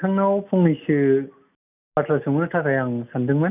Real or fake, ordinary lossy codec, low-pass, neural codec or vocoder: real; none; 3.6 kHz; none